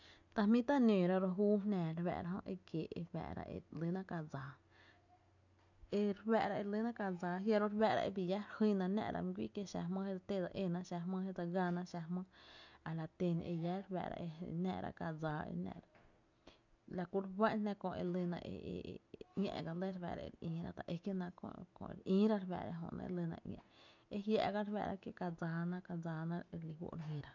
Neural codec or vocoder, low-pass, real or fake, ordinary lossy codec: none; 7.2 kHz; real; none